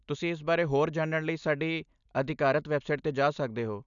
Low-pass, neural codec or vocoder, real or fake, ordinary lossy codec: 7.2 kHz; none; real; none